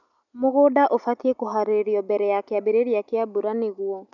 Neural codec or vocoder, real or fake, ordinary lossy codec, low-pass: none; real; none; 7.2 kHz